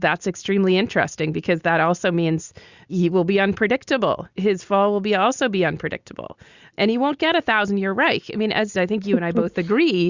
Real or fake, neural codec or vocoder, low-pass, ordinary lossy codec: real; none; 7.2 kHz; Opus, 64 kbps